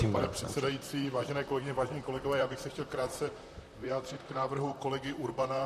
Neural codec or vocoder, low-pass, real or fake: vocoder, 44.1 kHz, 128 mel bands, Pupu-Vocoder; 14.4 kHz; fake